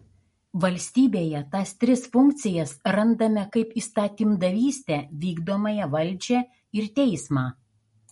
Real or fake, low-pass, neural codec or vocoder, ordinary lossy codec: real; 19.8 kHz; none; MP3, 48 kbps